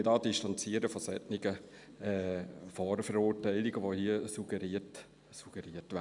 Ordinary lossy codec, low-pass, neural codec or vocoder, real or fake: none; 10.8 kHz; none; real